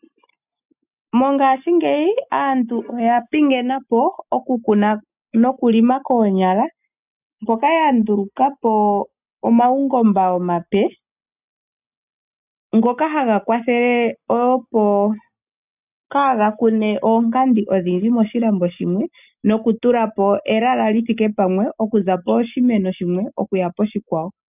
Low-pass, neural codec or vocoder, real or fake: 3.6 kHz; none; real